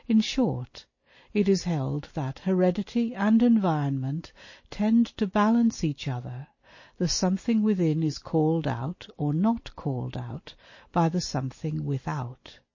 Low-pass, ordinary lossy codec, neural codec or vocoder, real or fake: 7.2 kHz; MP3, 32 kbps; none; real